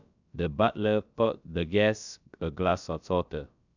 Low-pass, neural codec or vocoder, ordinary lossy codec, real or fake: 7.2 kHz; codec, 16 kHz, about 1 kbps, DyCAST, with the encoder's durations; none; fake